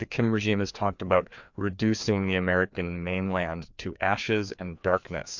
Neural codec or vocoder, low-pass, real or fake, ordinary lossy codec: codec, 16 kHz, 2 kbps, FreqCodec, larger model; 7.2 kHz; fake; MP3, 64 kbps